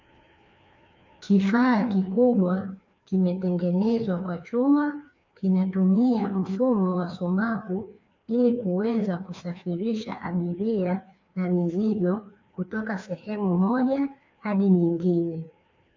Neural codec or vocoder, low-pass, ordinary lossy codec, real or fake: codec, 16 kHz, 2 kbps, FreqCodec, larger model; 7.2 kHz; MP3, 64 kbps; fake